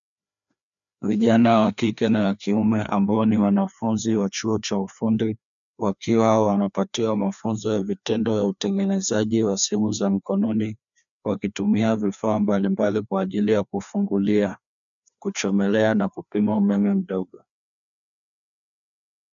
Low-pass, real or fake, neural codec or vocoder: 7.2 kHz; fake; codec, 16 kHz, 2 kbps, FreqCodec, larger model